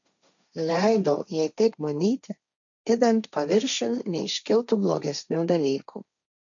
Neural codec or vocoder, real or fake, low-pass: codec, 16 kHz, 1.1 kbps, Voila-Tokenizer; fake; 7.2 kHz